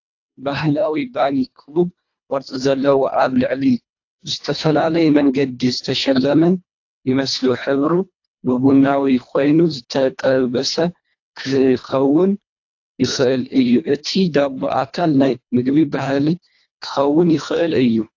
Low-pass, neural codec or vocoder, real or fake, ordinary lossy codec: 7.2 kHz; codec, 24 kHz, 1.5 kbps, HILCodec; fake; AAC, 48 kbps